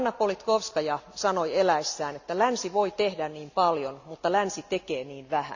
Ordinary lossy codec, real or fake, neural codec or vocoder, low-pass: none; real; none; 7.2 kHz